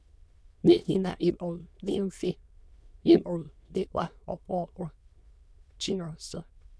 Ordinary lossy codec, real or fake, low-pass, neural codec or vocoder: none; fake; none; autoencoder, 22.05 kHz, a latent of 192 numbers a frame, VITS, trained on many speakers